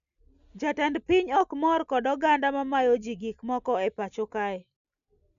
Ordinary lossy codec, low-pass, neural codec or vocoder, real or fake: none; 7.2 kHz; none; real